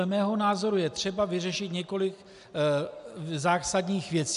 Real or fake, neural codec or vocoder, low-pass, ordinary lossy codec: real; none; 10.8 kHz; MP3, 96 kbps